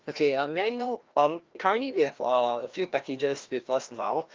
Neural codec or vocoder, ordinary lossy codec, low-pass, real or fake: codec, 16 kHz, 1 kbps, FreqCodec, larger model; Opus, 32 kbps; 7.2 kHz; fake